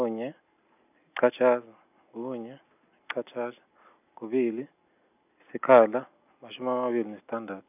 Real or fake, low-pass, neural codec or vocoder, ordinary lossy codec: real; 3.6 kHz; none; none